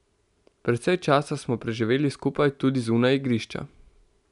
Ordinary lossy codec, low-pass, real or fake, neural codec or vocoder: none; 10.8 kHz; real; none